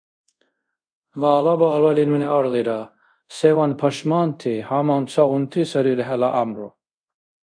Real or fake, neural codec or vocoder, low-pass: fake; codec, 24 kHz, 0.5 kbps, DualCodec; 9.9 kHz